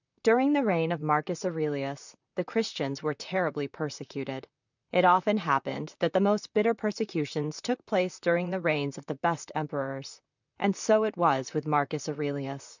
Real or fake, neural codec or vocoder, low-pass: fake; vocoder, 44.1 kHz, 128 mel bands, Pupu-Vocoder; 7.2 kHz